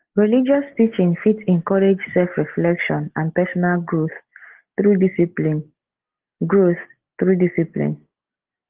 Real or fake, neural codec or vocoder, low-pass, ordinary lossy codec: real; none; 3.6 kHz; Opus, 16 kbps